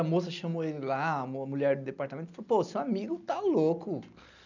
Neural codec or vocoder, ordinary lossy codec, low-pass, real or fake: none; none; 7.2 kHz; real